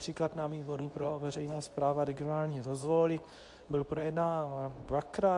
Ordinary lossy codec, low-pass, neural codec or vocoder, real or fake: AAC, 64 kbps; 10.8 kHz; codec, 24 kHz, 0.9 kbps, WavTokenizer, medium speech release version 2; fake